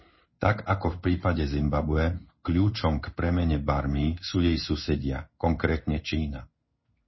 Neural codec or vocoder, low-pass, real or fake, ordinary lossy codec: codec, 16 kHz in and 24 kHz out, 1 kbps, XY-Tokenizer; 7.2 kHz; fake; MP3, 24 kbps